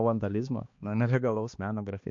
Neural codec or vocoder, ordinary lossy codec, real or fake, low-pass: codec, 16 kHz, 2 kbps, X-Codec, HuBERT features, trained on balanced general audio; MP3, 48 kbps; fake; 7.2 kHz